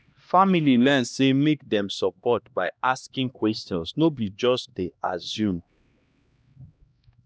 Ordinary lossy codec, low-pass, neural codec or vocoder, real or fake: none; none; codec, 16 kHz, 1 kbps, X-Codec, HuBERT features, trained on LibriSpeech; fake